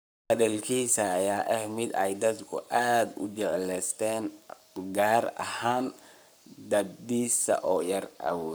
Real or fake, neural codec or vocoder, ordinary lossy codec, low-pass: fake; codec, 44.1 kHz, 7.8 kbps, Pupu-Codec; none; none